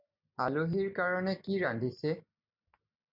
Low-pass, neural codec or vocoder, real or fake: 5.4 kHz; none; real